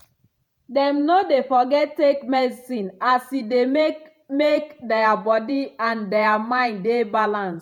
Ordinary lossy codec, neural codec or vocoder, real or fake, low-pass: none; vocoder, 48 kHz, 128 mel bands, Vocos; fake; 19.8 kHz